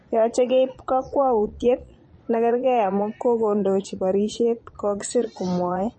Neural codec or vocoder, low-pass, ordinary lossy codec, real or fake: vocoder, 22.05 kHz, 80 mel bands, Vocos; 9.9 kHz; MP3, 32 kbps; fake